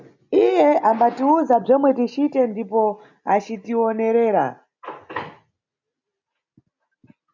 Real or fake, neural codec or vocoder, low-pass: real; none; 7.2 kHz